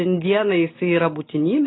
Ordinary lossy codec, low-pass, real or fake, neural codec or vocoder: AAC, 16 kbps; 7.2 kHz; real; none